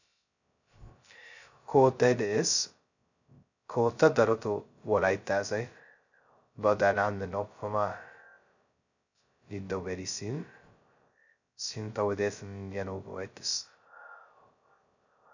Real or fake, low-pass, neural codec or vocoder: fake; 7.2 kHz; codec, 16 kHz, 0.2 kbps, FocalCodec